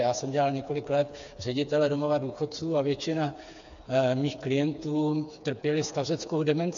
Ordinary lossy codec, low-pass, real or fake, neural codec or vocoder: AAC, 64 kbps; 7.2 kHz; fake; codec, 16 kHz, 4 kbps, FreqCodec, smaller model